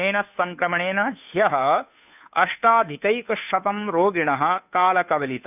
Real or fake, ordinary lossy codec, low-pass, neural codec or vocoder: fake; none; 3.6 kHz; codec, 16 kHz, 2 kbps, FunCodec, trained on Chinese and English, 25 frames a second